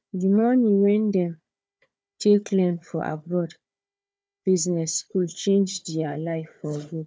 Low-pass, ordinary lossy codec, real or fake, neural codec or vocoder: none; none; fake; codec, 16 kHz, 4 kbps, FunCodec, trained on Chinese and English, 50 frames a second